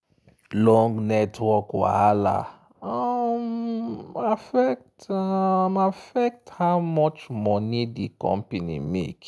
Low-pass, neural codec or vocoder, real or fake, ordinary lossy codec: none; none; real; none